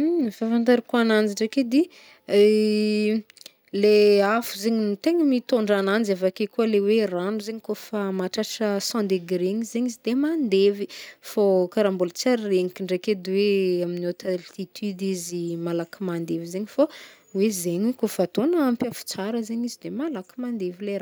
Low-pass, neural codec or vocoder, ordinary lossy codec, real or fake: none; none; none; real